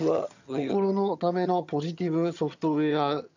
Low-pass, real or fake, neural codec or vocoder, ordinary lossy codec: 7.2 kHz; fake; vocoder, 22.05 kHz, 80 mel bands, HiFi-GAN; none